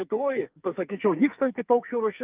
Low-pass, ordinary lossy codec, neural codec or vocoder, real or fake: 3.6 kHz; Opus, 24 kbps; codec, 44.1 kHz, 2.6 kbps, SNAC; fake